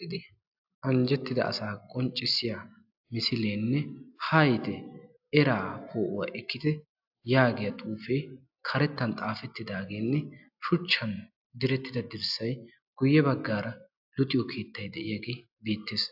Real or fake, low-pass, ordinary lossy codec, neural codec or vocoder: real; 5.4 kHz; AAC, 48 kbps; none